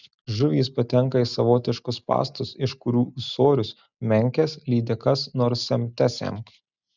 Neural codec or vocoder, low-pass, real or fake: none; 7.2 kHz; real